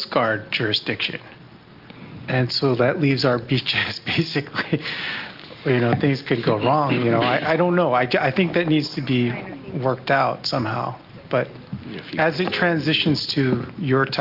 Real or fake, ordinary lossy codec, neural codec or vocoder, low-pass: real; Opus, 32 kbps; none; 5.4 kHz